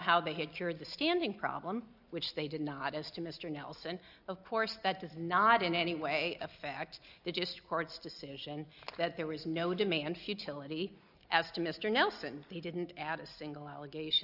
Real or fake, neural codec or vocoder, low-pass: real; none; 5.4 kHz